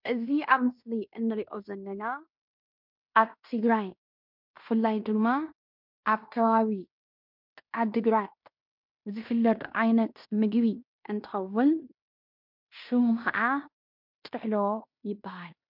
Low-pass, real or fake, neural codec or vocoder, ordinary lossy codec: 5.4 kHz; fake; codec, 16 kHz in and 24 kHz out, 0.9 kbps, LongCat-Audio-Codec, fine tuned four codebook decoder; MP3, 48 kbps